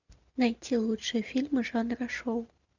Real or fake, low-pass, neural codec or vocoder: real; 7.2 kHz; none